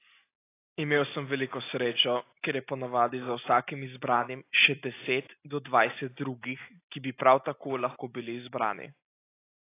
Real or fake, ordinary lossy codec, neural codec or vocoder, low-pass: real; AAC, 24 kbps; none; 3.6 kHz